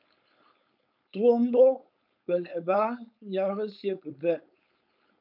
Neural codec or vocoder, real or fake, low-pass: codec, 16 kHz, 4.8 kbps, FACodec; fake; 5.4 kHz